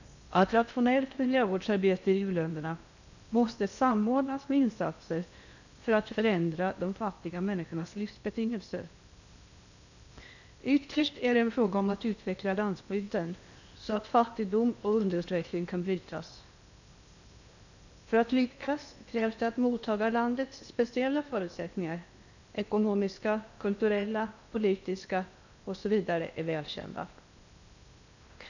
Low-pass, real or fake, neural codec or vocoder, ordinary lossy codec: 7.2 kHz; fake; codec, 16 kHz in and 24 kHz out, 0.8 kbps, FocalCodec, streaming, 65536 codes; none